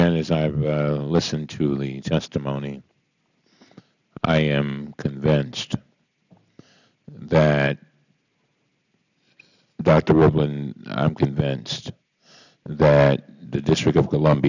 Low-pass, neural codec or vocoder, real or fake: 7.2 kHz; none; real